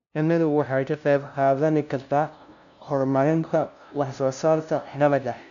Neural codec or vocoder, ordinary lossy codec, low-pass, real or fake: codec, 16 kHz, 0.5 kbps, FunCodec, trained on LibriTTS, 25 frames a second; none; 7.2 kHz; fake